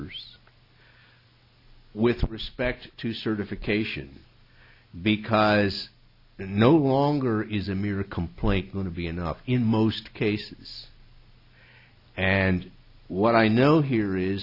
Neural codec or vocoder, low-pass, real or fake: none; 5.4 kHz; real